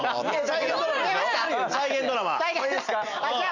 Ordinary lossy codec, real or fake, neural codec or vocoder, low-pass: none; real; none; 7.2 kHz